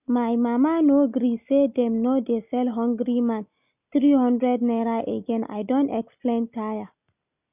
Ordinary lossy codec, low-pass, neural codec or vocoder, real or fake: none; 3.6 kHz; none; real